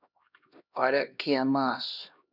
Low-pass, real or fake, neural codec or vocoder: 5.4 kHz; fake; codec, 16 kHz, 1 kbps, X-Codec, HuBERT features, trained on LibriSpeech